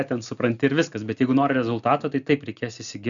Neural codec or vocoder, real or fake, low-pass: none; real; 7.2 kHz